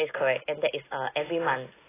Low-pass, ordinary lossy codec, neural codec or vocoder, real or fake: 3.6 kHz; AAC, 16 kbps; none; real